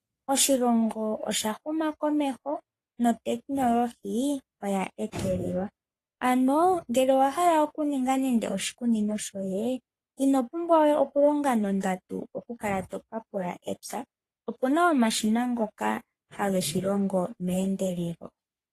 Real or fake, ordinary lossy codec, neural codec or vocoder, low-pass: fake; AAC, 48 kbps; codec, 44.1 kHz, 3.4 kbps, Pupu-Codec; 14.4 kHz